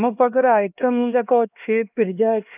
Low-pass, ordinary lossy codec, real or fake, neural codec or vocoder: 3.6 kHz; none; fake; codec, 16 kHz, 1 kbps, X-Codec, HuBERT features, trained on LibriSpeech